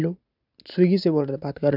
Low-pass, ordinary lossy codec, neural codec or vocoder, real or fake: 5.4 kHz; none; none; real